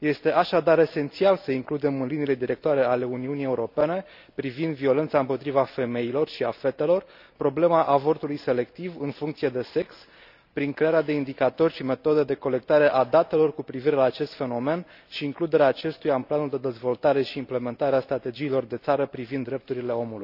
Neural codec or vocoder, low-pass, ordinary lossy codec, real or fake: none; 5.4 kHz; none; real